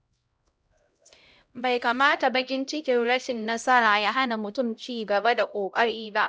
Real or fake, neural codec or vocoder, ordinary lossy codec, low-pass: fake; codec, 16 kHz, 0.5 kbps, X-Codec, HuBERT features, trained on LibriSpeech; none; none